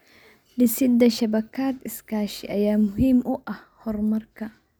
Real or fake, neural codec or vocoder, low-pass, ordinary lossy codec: real; none; none; none